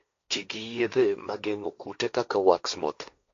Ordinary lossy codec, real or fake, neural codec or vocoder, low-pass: AAC, 48 kbps; fake; codec, 16 kHz, 1.1 kbps, Voila-Tokenizer; 7.2 kHz